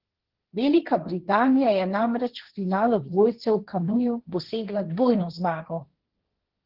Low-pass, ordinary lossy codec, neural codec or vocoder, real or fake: 5.4 kHz; Opus, 16 kbps; codec, 16 kHz, 1.1 kbps, Voila-Tokenizer; fake